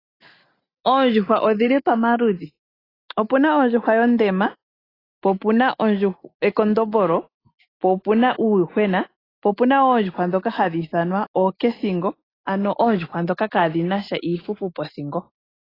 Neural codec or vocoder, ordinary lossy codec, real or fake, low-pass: none; AAC, 24 kbps; real; 5.4 kHz